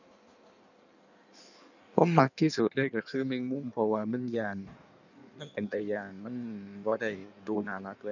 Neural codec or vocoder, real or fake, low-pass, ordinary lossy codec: codec, 16 kHz in and 24 kHz out, 1.1 kbps, FireRedTTS-2 codec; fake; 7.2 kHz; none